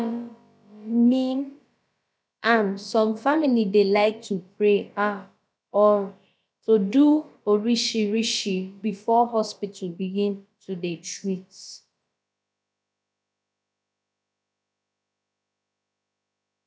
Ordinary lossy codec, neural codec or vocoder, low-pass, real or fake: none; codec, 16 kHz, about 1 kbps, DyCAST, with the encoder's durations; none; fake